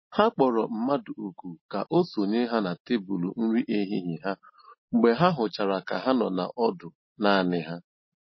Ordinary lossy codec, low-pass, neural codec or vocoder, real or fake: MP3, 24 kbps; 7.2 kHz; none; real